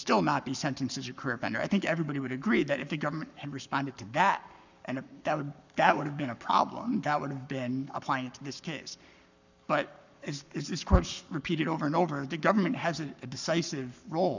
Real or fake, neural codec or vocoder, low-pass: fake; codec, 44.1 kHz, 7.8 kbps, Pupu-Codec; 7.2 kHz